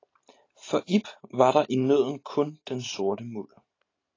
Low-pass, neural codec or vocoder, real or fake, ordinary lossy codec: 7.2 kHz; none; real; AAC, 32 kbps